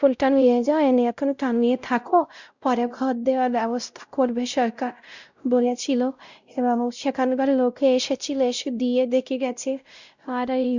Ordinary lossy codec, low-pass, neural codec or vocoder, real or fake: Opus, 64 kbps; 7.2 kHz; codec, 16 kHz, 0.5 kbps, X-Codec, WavLM features, trained on Multilingual LibriSpeech; fake